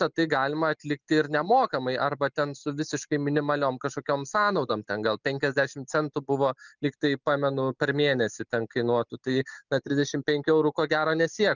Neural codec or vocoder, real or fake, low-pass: none; real; 7.2 kHz